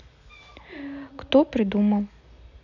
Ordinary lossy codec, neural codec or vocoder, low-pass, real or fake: none; none; 7.2 kHz; real